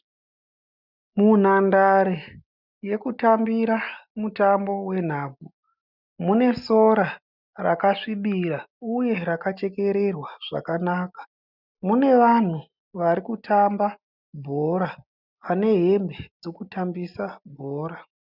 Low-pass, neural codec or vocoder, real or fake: 5.4 kHz; none; real